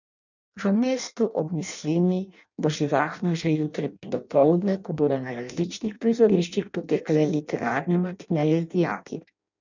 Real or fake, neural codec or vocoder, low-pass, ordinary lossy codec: fake; codec, 16 kHz in and 24 kHz out, 0.6 kbps, FireRedTTS-2 codec; 7.2 kHz; none